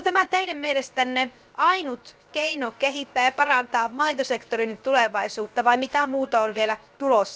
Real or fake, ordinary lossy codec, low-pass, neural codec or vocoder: fake; none; none; codec, 16 kHz, 0.7 kbps, FocalCodec